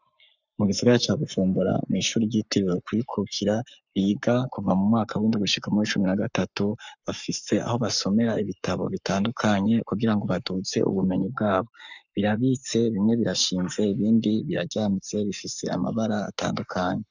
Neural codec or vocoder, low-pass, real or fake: codec, 44.1 kHz, 7.8 kbps, Pupu-Codec; 7.2 kHz; fake